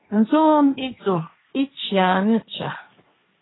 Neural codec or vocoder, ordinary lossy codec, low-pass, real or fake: codec, 16 kHz, 1.1 kbps, Voila-Tokenizer; AAC, 16 kbps; 7.2 kHz; fake